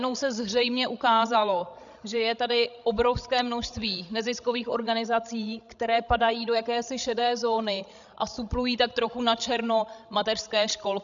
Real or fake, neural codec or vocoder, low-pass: fake; codec, 16 kHz, 16 kbps, FreqCodec, larger model; 7.2 kHz